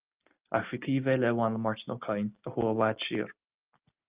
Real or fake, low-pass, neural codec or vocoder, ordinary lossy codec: real; 3.6 kHz; none; Opus, 24 kbps